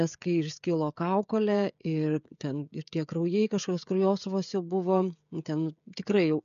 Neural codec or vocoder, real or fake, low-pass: codec, 16 kHz, 16 kbps, FreqCodec, smaller model; fake; 7.2 kHz